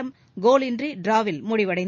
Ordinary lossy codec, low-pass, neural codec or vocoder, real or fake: none; 7.2 kHz; none; real